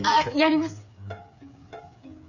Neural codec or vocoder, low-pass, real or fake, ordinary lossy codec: codec, 16 kHz, 8 kbps, FreqCodec, smaller model; 7.2 kHz; fake; none